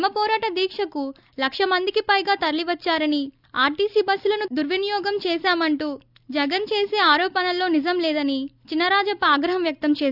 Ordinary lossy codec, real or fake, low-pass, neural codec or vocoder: none; real; 5.4 kHz; none